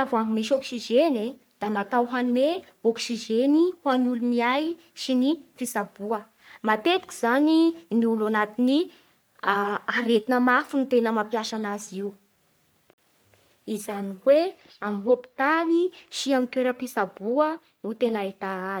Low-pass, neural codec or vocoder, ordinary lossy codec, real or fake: none; codec, 44.1 kHz, 3.4 kbps, Pupu-Codec; none; fake